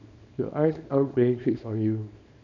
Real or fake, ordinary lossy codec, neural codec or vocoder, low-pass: fake; none; codec, 24 kHz, 0.9 kbps, WavTokenizer, small release; 7.2 kHz